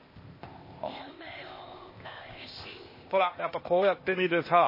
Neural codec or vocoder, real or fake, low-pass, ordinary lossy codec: codec, 16 kHz, 0.8 kbps, ZipCodec; fake; 5.4 kHz; MP3, 24 kbps